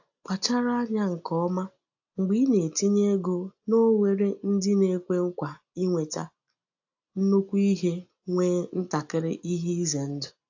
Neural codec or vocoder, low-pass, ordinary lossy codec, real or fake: none; 7.2 kHz; AAC, 48 kbps; real